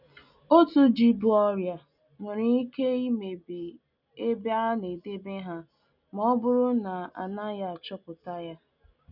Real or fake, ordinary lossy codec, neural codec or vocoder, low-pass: real; none; none; 5.4 kHz